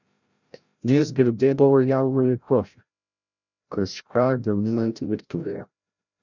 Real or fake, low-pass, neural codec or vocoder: fake; 7.2 kHz; codec, 16 kHz, 0.5 kbps, FreqCodec, larger model